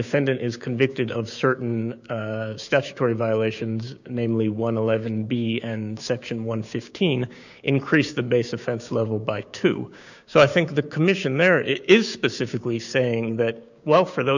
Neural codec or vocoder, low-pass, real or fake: codec, 16 kHz, 6 kbps, DAC; 7.2 kHz; fake